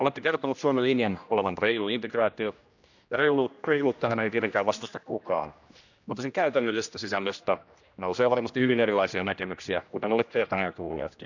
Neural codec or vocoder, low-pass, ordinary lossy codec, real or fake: codec, 16 kHz, 1 kbps, X-Codec, HuBERT features, trained on general audio; 7.2 kHz; none; fake